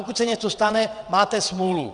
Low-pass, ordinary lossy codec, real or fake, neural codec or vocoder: 9.9 kHz; Opus, 64 kbps; fake; vocoder, 22.05 kHz, 80 mel bands, WaveNeXt